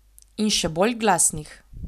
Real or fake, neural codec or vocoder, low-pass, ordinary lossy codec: real; none; 14.4 kHz; none